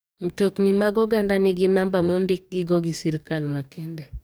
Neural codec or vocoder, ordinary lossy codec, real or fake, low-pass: codec, 44.1 kHz, 2.6 kbps, DAC; none; fake; none